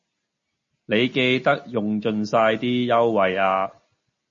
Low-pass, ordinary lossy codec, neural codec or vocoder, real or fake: 7.2 kHz; MP3, 32 kbps; none; real